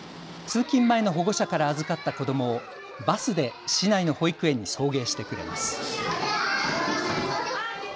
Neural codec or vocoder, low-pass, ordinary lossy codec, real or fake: none; none; none; real